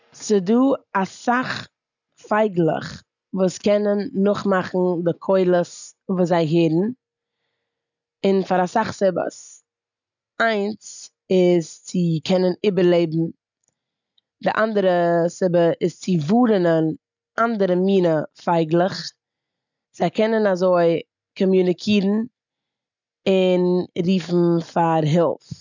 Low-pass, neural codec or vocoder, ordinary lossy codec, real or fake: 7.2 kHz; none; none; real